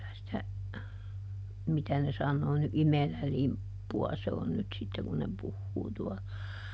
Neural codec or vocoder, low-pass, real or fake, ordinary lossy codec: none; none; real; none